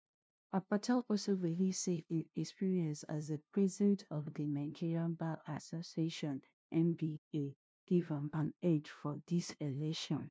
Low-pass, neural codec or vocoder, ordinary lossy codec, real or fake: none; codec, 16 kHz, 0.5 kbps, FunCodec, trained on LibriTTS, 25 frames a second; none; fake